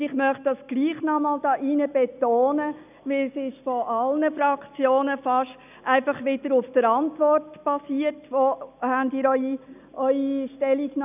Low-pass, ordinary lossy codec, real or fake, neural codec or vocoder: 3.6 kHz; none; real; none